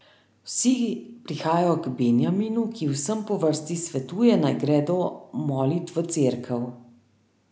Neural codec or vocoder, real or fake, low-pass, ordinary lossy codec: none; real; none; none